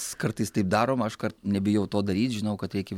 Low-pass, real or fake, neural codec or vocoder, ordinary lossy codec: 19.8 kHz; real; none; MP3, 96 kbps